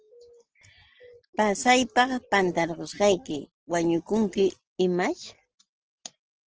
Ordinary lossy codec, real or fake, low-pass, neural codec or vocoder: Opus, 16 kbps; real; 7.2 kHz; none